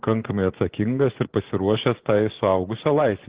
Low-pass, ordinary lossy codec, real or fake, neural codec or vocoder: 3.6 kHz; Opus, 16 kbps; fake; vocoder, 24 kHz, 100 mel bands, Vocos